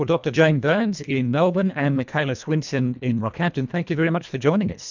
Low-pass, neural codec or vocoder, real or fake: 7.2 kHz; codec, 24 kHz, 1.5 kbps, HILCodec; fake